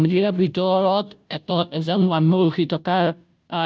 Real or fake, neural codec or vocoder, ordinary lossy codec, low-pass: fake; codec, 16 kHz, 0.5 kbps, FunCodec, trained on Chinese and English, 25 frames a second; none; none